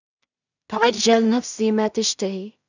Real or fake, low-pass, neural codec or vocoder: fake; 7.2 kHz; codec, 16 kHz in and 24 kHz out, 0.4 kbps, LongCat-Audio-Codec, two codebook decoder